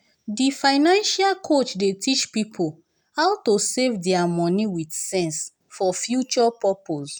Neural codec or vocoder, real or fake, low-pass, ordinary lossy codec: none; real; none; none